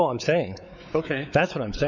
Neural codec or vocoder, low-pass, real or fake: codec, 16 kHz, 4 kbps, X-Codec, WavLM features, trained on Multilingual LibriSpeech; 7.2 kHz; fake